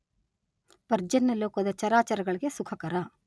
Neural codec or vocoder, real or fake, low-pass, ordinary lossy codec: none; real; none; none